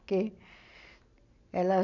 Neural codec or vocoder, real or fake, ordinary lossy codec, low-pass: none; real; none; 7.2 kHz